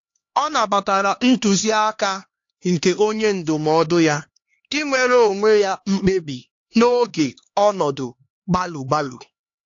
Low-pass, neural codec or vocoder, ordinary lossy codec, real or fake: 7.2 kHz; codec, 16 kHz, 2 kbps, X-Codec, HuBERT features, trained on LibriSpeech; AAC, 48 kbps; fake